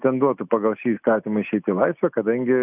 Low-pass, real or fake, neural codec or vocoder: 3.6 kHz; real; none